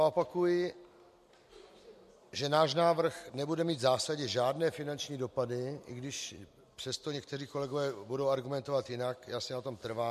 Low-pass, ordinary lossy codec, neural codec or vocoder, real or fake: 14.4 kHz; MP3, 64 kbps; none; real